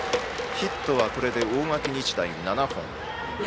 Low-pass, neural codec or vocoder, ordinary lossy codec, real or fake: none; none; none; real